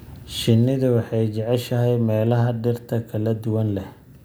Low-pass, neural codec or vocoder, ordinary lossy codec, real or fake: none; none; none; real